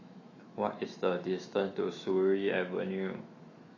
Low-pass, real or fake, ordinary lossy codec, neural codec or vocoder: 7.2 kHz; fake; AAC, 32 kbps; autoencoder, 48 kHz, 128 numbers a frame, DAC-VAE, trained on Japanese speech